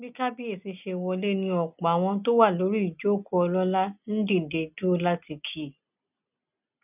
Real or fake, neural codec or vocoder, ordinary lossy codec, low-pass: real; none; none; 3.6 kHz